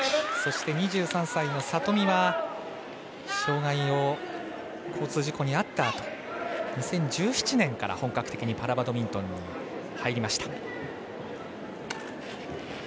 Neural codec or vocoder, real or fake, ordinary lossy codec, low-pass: none; real; none; none